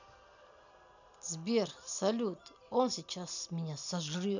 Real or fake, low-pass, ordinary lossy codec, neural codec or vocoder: real; 7.2 kHz; none; none